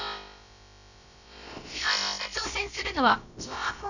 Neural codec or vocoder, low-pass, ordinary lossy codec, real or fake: codec, 16 kHz, about 1 kbps, DyCAST, with the encoder's durations; 7.2 kHz; none; fake